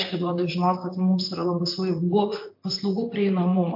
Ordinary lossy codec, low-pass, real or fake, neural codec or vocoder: MP3, 32 kbps; 5.4 kHz; fake; vocoder, 44.1 kHz, 128 mel bands, Pupu-Vocoder